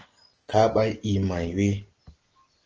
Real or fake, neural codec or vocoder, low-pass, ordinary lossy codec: real; none; 7.2 kHz; Opus, 16 kbps